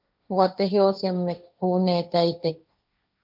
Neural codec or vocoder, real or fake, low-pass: codec, 16 kHz, 1.1 kbps, Voila-Tokenizer; fake; 5.4 kHz